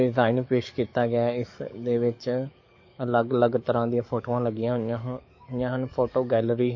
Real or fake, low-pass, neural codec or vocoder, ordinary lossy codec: fake; 7.2 kHz; codec, 44.1 kHz, 7.8 kbps, DAC; MP3, 32 kbps